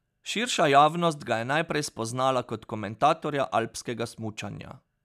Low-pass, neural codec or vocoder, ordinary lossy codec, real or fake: 14.4 kHz; none; none; real